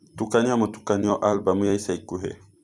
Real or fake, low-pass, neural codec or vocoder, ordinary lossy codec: fake; 10.8 kHz; vocoder, 44.1 kHz, 128 mel bands every 256 samples, BigVGAN v2; none